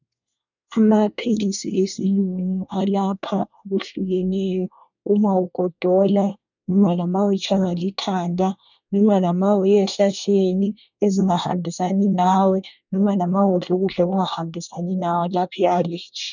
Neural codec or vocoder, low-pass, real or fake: codec, 24 kHz, 1 kbps, SNAC; 7.2 kHz; fake